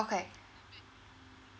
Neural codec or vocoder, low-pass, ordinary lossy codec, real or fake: none; none; none; real